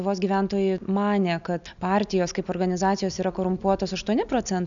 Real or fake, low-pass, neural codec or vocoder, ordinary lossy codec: real; 7.2 kHz; none; MP3, 96 kbps